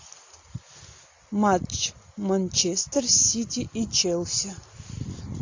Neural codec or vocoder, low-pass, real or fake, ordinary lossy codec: none; 7.2 kHz; real; AAC, 48 kbps